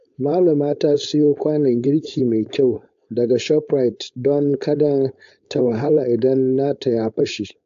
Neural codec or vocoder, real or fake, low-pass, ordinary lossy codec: codec, 16 kHz, 4.8 kbps, FACodec; fake; 7.2 kHz; AAC, 64 kbps